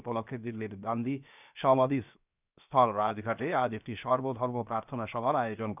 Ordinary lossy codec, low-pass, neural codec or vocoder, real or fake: none; 3.6 kHz; codec, 16 kHz, 0.7 kbps, FocalCodec; fake